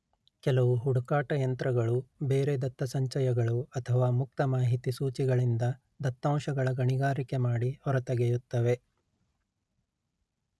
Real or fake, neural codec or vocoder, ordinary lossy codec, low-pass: real; none; none; none